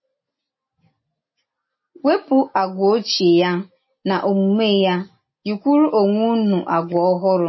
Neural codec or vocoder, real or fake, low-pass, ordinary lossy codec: none; real; 7.2 kHz; MP3, 24 kbps